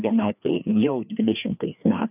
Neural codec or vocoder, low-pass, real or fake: codec, 16 kHz, 2 kbps, FreqCodec, larger model; 3.6 kHz; fake